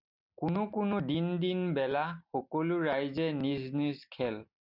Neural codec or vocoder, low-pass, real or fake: none; 5.4 kHz; real